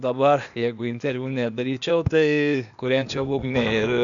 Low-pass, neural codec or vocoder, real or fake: 7.2 kHz; codec, 16 kHz, 0.8 kbps, ZipCodec; fake